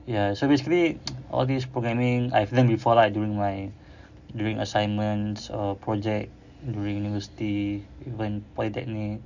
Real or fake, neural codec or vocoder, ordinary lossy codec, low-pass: real; none; none; 7.2 kHz